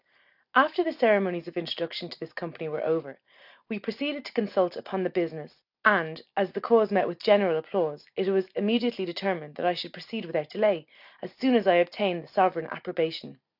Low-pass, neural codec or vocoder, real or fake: 5.4 kHz; none; real